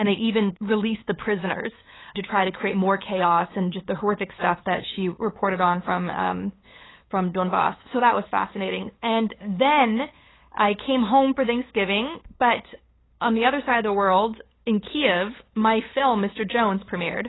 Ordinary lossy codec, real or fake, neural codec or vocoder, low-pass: AAC, 16 kbps; fake; codec, 16 kHz, 8 kbps, FunCodec, trained on LibriTTS, 25 frames a second; 7.2 kHz